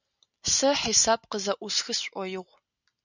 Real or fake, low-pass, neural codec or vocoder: real; 7.2 kHz; none